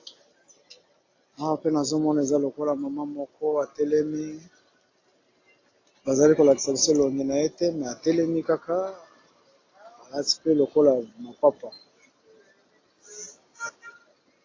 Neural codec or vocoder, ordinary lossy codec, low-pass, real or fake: none; AAC, 32 kbps; 7.2 kHz; real